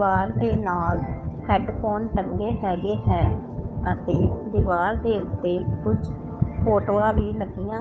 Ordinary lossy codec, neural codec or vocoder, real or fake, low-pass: none; codec, 16 kHz, 2 kbps, FunCodec, trained on Chinese and English, 25 frames a second; fake; none